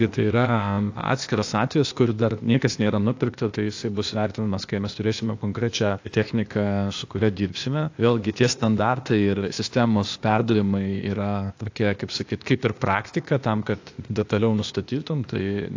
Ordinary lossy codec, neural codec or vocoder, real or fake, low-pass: AAC, 48 kbps; codec, 16 kHz, 0.8 kbps, ZipCodec; fake; 7.2 kHz